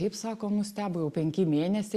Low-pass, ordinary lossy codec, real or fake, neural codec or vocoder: 14.4 kHz; Opus, 64 kbps; real; none